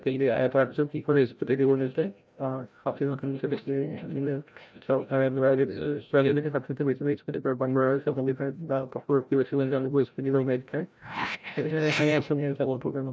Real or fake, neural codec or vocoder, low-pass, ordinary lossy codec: fake; codec, 16 kHz, 0.5 kbps, FreqCodec, larger model; none; none